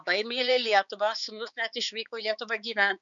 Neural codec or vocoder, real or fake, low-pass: codec, 16 kHz, 4 kbps, X-Codec, HuBERT features, trained on balanced general audio; fake; 7.2 kHz